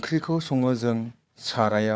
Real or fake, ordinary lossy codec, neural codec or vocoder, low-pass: fake; none; codec, 16 kHz, 4 kbps, FunCodec, trained on Chinese and English, 50 frames a second; none